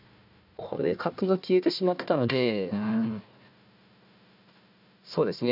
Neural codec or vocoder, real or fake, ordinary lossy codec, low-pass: codec, 16 kHz, 1 kbps, FunCodec, trained on Chinese and English, 50 frames a second; fake; none; 5.4 kHz